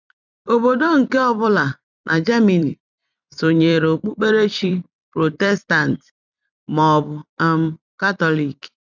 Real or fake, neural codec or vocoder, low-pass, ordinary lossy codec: fake; vocoder, 44.1 kHz, 128 mel bands every 256 samples, BigVGAN v2; 7.2 kHz; none